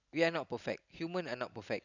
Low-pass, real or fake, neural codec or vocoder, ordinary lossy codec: 7.2 kHz; real; none; none